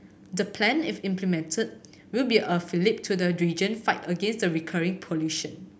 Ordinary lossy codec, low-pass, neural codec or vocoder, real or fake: none; none; none; real